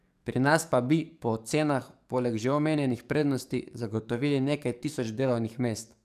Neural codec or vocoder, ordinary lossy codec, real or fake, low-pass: codec, 44.1 kHz, 7.8 kbps, DAC; none; fake; 14.4 kHz